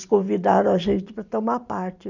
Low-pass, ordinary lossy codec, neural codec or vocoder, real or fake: 7.2 kHz; none; vocoder, 44.1 kHz, 128 mel bands every 256 samples, BigVGAN v2; fake